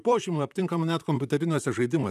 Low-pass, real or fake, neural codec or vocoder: 14.4 kHz; fake; vocoder, 44.1 kHz, 128 mel bands, Pupu-Vocoder